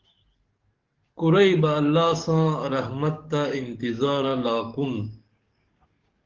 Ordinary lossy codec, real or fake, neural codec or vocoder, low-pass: Opus, 16 kbps; fake; codec, 44.1 kHz, 7.8 kbps, DAC; 7.2 kHz